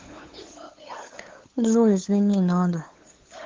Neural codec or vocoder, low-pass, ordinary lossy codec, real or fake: codec, 16 kHz, 8 kbps, FunCodec, trained on LibriTTS, 25 frames a second; 7.2 kHz; Opus, 16 kbps; fake